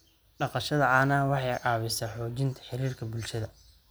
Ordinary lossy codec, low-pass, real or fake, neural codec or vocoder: none; none; real; none